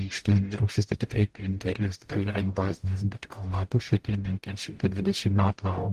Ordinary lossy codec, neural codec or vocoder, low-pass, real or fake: Opus, 24 kbps; codec, 44.1 kHz, 0.9 kbps, DAC; 14.4 kHz; fake